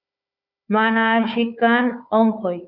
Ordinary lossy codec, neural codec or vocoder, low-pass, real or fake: Opus, 64 kbps; codec, 16 kHz, 4 kbps, FunCodec, trained on Chinese and English, 50 frames a second; 5.4 kHz; fake